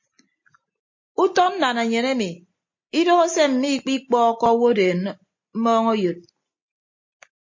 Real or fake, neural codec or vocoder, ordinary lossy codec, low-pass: real; none; MP3, 32 kbps; 7.2 kHz